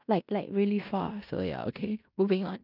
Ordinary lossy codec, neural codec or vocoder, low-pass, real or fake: none; codec, 16 kHz in and 24 kHz out, 0.9 kbps, LongCat-Audio-Codec, fine tuned four codebook decoder; 5.4 kHz; fake